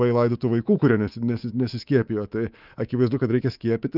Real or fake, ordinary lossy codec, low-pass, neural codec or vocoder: fake; Opus, 24 kbps; 5.4 kHz; codec, 24 kHz, 3.1 kbps, DualCodec